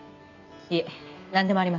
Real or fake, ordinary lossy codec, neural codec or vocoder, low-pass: real; none; none; 7.2 kHz